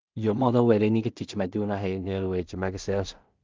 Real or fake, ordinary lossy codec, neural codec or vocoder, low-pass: fake; Opus, 32 kbps; codec, 16 kHz in and 24 kHz out, 0.4 kbps, LongCat-Audio-Codec, two codebook decoder; 7.2 kHz